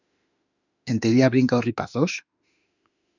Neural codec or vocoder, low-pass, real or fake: autoencoder, 48 kHz, 32 numbers a frame, DAC-VAE, trained on Japanese speech; 7.2 kHz; fake